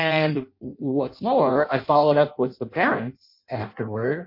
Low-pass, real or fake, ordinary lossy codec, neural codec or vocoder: 5.4 kHz; fake; MP3, 32 kbps; codec, 16 kHz in and 24 kHz out, 0.6 kbps, FireRedTTS-2 codec